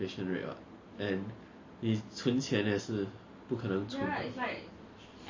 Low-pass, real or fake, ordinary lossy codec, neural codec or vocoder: 7.2 kHz; real; MP3, 32 kbps; none